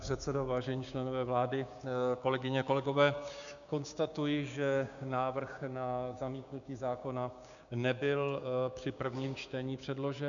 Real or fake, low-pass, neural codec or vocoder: fake; 7.2 kHz; codec, 16 kHz, 6 kbps, DAC